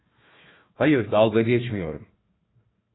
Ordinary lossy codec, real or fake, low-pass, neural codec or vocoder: AAC, 16 kbps; fake; 7.2 kHz; codec, 16 kHz, 1 kbps, FunCodec, trained on Chinese and English, 50 frames a second